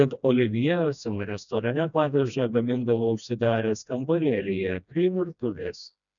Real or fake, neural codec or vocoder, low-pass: fake; codec, 16 kHz, 1 kbps, FreqCodec, smaller model; 7.2 kHz